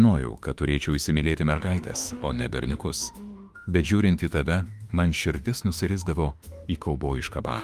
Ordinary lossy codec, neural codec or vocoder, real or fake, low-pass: Opus, 32 kbps; autoencoder, 48 kHz, 32 numbers a frame, DAC-VAE, trained on Japanese speech; fake; 14.4 kHz